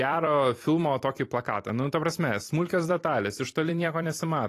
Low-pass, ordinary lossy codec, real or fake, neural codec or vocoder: 14.4 kHz; AAC, 48 kbps; fake; vocoder, 44.1 kHz, 128 mel bands every 256 samples, BigVGAN v2